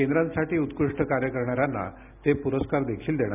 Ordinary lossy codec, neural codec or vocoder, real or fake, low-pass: none; none; real; 3.6 kHz